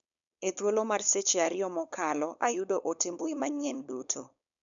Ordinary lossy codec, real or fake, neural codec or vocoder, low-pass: none; fake; codec, 16 kHz, 4.8 kbps, FACodec; 7.2 kHz